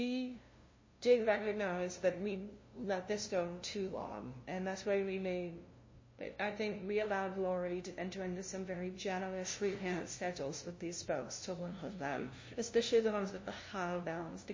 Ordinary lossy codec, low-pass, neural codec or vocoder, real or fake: MP3, 32 kbps; 7.2 kHz; codec, 16 kHz, 0.5 kbps, FunCodec, trained on LibriTTS, 25 frames a second; fake